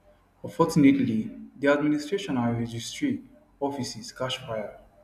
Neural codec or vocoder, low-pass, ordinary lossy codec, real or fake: none; 14.4 kHz; none; real